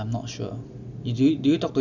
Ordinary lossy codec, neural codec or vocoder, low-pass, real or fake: none; none; 7.2 kHz; real